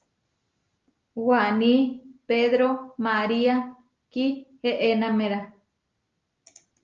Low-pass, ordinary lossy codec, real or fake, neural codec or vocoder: 7.2 kHz; Opus, 32 kbps; real; none